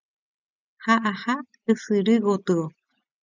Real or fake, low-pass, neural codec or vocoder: real; 7.2 kHz; none